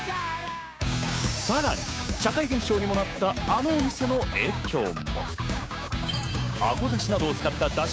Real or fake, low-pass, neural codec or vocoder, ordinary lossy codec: fake; none; codec, 16 kHz, 6 kbps, DAC; none